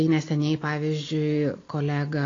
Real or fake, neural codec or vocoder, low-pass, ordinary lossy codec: real; none; 7.2 kHz; AAC, 32 kbps